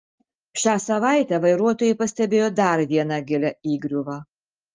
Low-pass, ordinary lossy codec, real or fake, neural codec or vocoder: 7.2 kHz; Opus, 24 kbps; real; none